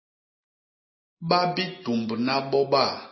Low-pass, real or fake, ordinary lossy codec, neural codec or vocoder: 7.2 kHz; real; MP3, 24 kbps; none